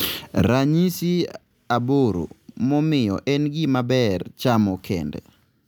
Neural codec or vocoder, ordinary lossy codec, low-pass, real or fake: none; none; none; real